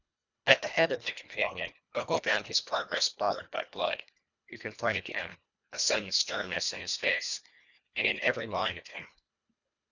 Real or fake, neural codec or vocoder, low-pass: fake; codec, 24 kHz, 1.5 kbps, HILCodec; 7.2 kHz